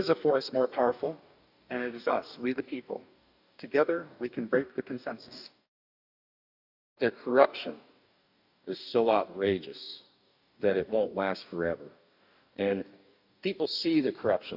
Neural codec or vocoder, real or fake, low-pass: codec, 44.1 kHz, 2.6 kbps, DAC; fake; 5.4 kHz